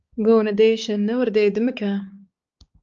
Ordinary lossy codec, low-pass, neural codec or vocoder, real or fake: Opus, 24 kbps; 7.2 kHz; codec, 16 kHz, 4 kbps, X-Codec, HuBERT features, trained on balanced general audio; fake